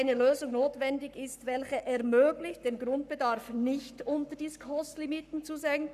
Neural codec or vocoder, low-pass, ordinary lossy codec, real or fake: codec, 44.1 kHz, 7.8 kbps, Pupu-Codec; 14.4 kHz; none; fake